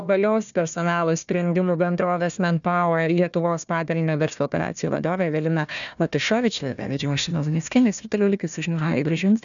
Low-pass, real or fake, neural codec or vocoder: 7.2 kHz; fake; codec, 16 kHz, 1 kbps, FunCodec, trained on Chinese and English, 50 frames a second